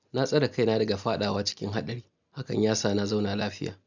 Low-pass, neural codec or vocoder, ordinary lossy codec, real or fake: 7.2 kHz; none; none; real